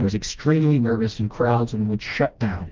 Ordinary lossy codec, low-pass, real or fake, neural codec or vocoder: Opus, 24 kbps; 7.2 kHz; fake; codec, 16 kHz, 1 kbps, FreqCodec, smaller model